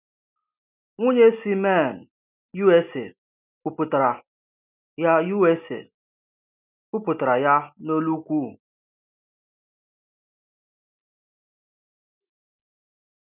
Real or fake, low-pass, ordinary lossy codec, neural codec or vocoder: real; 3.6 kHz; none; none